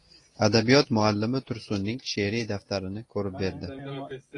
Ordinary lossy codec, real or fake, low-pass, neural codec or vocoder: AAC, 32 kbps; real; 10.8 kHz; none